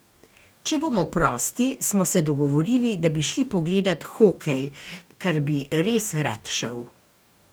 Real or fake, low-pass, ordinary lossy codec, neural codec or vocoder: fake; none; none; codec, 44.1 kHz, 2.6 kbps, DAC